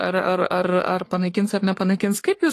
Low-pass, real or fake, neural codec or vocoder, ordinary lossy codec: 14.4 kHz; fake; codec, 44.1 kHz, 3.4 kbps, Pupu-Codec; AAC, 48 kbps